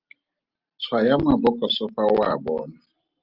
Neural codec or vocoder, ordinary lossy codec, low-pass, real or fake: none; Opus, 32 kbps; 5.4 kHz; real